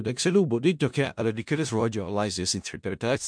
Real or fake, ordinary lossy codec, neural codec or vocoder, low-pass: fake; MP3, 64 kbps; codec, 16 kHz in and 24 kHz out, 0.4 kbps, LongCat-Audio-Codec, four codebook decoder; 9.9 kHz